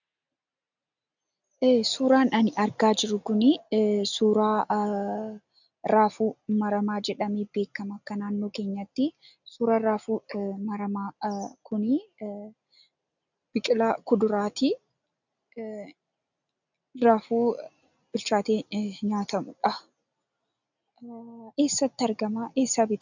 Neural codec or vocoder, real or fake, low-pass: none; real; 7.2 kHz